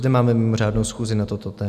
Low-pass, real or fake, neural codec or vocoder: 14.4 kHz; real; none